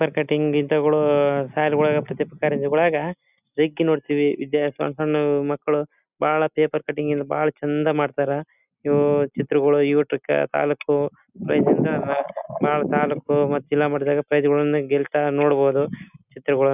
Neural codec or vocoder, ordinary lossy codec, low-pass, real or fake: none; none; 3.6 kHz; real